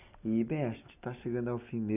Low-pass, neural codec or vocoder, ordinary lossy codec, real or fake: 3.6 kHz; none; none; real